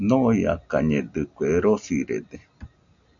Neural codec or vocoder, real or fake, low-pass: none; real; 7.2 kHz